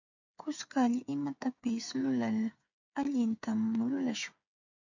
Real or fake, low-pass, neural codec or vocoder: fake; 7.2 kHz; codec, 16 kHz, 8 kbps, FreqCodec, smaller model